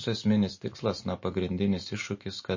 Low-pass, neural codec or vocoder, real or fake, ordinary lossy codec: 7.2 kHz; none; real; MP3, 32 kbps